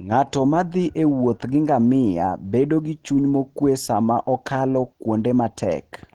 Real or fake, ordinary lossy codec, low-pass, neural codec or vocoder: real; Opus, 16 kbps; 19.8 kHz; none